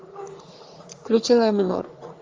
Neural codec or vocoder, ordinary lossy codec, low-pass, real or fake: codec, 44.1 kHz, 3.4 kbps, Pupu-Codec; Opus, 32 kbps; 7.2 kHz; fake